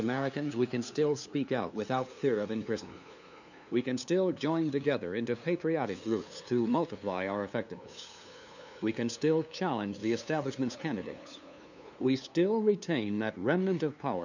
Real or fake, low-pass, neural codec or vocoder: fake; 7.2 kHz; codec, 16 kHz, 2 kbps, FunCodec, trained on LibriTTS, 25 frames a second